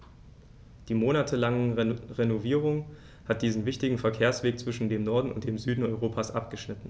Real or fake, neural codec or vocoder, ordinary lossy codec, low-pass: real; none; none; none